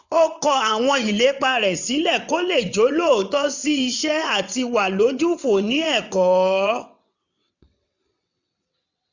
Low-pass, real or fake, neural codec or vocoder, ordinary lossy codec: 7.2 kHz; fake; vocoder, 22.05 kHz, 80 mel bands, WaveNeXt; none